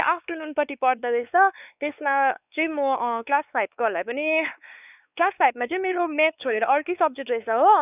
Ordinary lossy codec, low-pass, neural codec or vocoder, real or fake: none; 3.6 kHz; codec, 16 kHz, 4 kbps, X-Codec, WavLM features, trained on Multilingual LibriSpeech; fake